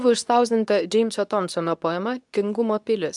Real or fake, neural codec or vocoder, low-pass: fake; codec, 24 kHz, 0.9 kbps, WavTokenizer, medium speech release version 2; 10.8 kHz